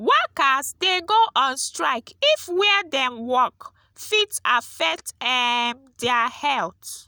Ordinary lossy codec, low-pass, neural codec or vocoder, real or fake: none; none; none; real